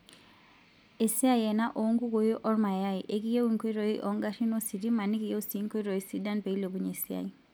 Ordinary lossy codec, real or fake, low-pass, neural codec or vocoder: none; real; none; none